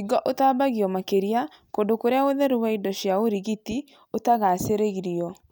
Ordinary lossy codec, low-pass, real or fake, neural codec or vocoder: none; none; real; none